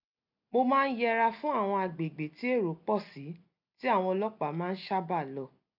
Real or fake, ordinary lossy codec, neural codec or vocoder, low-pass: real; none; none; 5.4 kHz